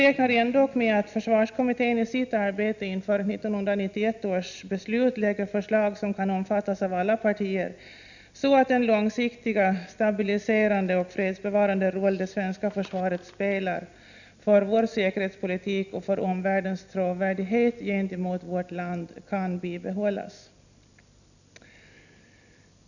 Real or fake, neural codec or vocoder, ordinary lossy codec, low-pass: real; none; none; 7.2 kHz